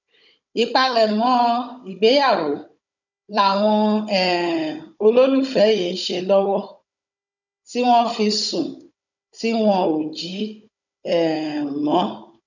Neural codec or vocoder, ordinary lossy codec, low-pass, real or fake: codec, 16 kHz, 16 kbps, FunCodec, trained on Chinese and English, 50 frames a second; none; 7.2 kHz; fake